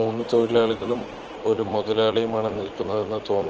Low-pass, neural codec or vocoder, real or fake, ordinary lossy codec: 7.2 kHz; vocoder, 44.1 kHz, 80 mel bands, Vocos; fake; Opus, 16 kbps